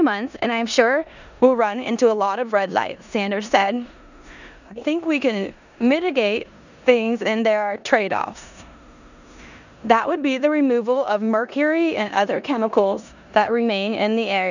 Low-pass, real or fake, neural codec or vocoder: 7.2 kHz; fake; codec, 16 kHz in and 24 kHz out, 0.9 kbps, LongCat-Audio-Codec, four codebook decoder